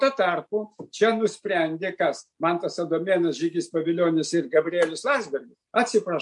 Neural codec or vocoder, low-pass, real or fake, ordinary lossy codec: none; 10.8 kHz; real; MP3, 64 kbps